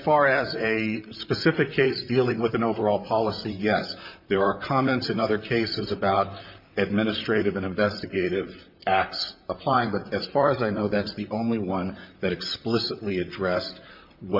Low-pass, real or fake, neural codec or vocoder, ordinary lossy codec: 5.4 kHz; fake; vocoder, 44.1 kHz, 128 mel bands, Pupu-Vocoder; MP3, 48 kbps